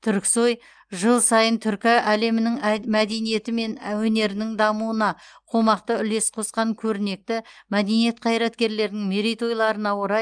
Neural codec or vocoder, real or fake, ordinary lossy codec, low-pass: none; real; Opus, 32 kbps; 9.9 kHz